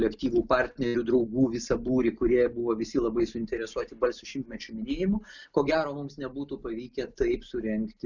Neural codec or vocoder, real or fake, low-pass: none; real; 7.2 kHz